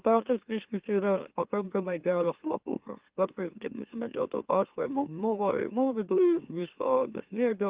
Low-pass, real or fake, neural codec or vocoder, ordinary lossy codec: 3.6 kHz; fake; autoencoder, 44.1 kHz, a latent of 192 numbers a frame, MeloTTS; Opus, 32 kbps